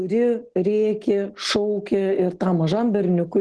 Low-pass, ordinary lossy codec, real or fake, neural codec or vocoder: 10.8 kHz; Opus, 16 kbps; real; none